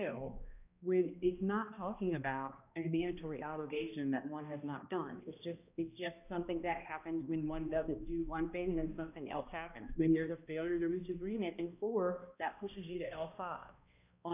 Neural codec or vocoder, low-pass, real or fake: codec, 16 kHz, 1 kbps, X-Codec, HuBERT features, trained on balanced general audio; 3.6 kHz; fake